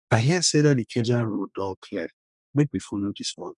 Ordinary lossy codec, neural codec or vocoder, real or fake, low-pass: none; codec, 24 kHz, 1 kbps, SNAC; fake; 10.8 kHz